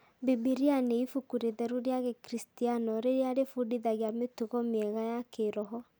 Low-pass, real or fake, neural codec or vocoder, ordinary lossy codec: none; real; none; none